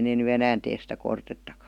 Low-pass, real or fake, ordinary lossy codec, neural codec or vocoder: 19.8 kHz; real; none; none